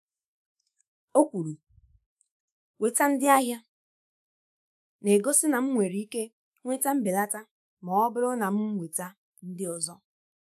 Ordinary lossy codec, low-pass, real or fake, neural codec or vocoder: none; 14.4 kHz; fake; autoencoder, 48 kHz, 128 numbers a frame, DAC-VAE, trained on Japanese speech